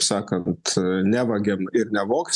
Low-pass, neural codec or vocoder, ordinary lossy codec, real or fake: 10.8 kHz; none; MP3, 96 kbps; real